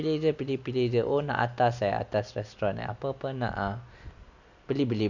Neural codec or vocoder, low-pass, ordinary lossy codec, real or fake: none; 7.2 kHz; none; real